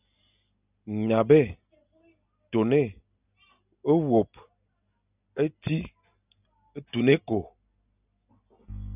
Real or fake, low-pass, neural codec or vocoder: real; 3.6 kHz; none